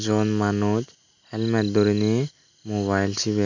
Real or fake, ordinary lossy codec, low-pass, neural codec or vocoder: real; none; 7.2 kHz; none